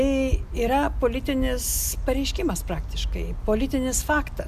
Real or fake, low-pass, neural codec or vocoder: real; 14.4 kHz; none